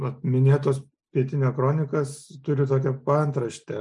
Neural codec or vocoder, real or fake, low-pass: none; real; 10.8 kHz